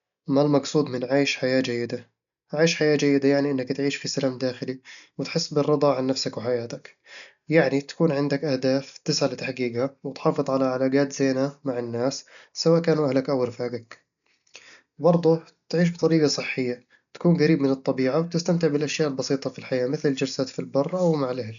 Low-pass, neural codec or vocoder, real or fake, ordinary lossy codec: 7.2 kHz; none; real; none